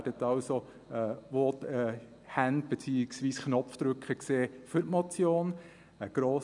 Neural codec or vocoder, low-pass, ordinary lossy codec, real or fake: none; 10.8 kHz; none; real